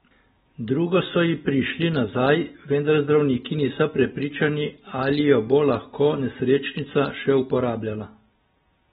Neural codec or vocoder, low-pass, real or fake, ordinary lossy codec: none; 19.8 kHz; real; AAC, 16 kbps